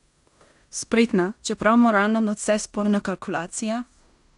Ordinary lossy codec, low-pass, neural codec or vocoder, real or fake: none; 10.8 kHz; codec, 16 kHz in and 24 kHz out, 0.9 kbps, LongCat-Audio-Codec, fine tuned four codebook decoder; fake